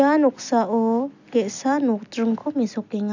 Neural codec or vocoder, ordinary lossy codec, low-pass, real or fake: none; none; 7.2 kHz; real